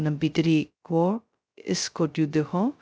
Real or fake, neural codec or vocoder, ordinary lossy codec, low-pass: fake; codec, 16 kHz, 0.2 kbps, FocalCodec; none; none